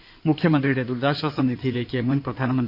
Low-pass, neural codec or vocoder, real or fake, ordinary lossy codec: 5.4 kHz; codec, 16 kHz in and 24 kHz out, 2.2 kbps, FireRedTTS-2 codec; fake; none